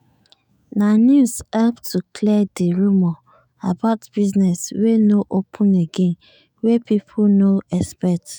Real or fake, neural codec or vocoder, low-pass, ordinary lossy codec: fake; autoencoder, 48 kHz, 128 numbers a frame, DAC-VAE, trained on Japanese speech; none; none